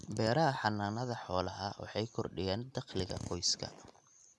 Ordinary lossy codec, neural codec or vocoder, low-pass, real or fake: none; none; none; real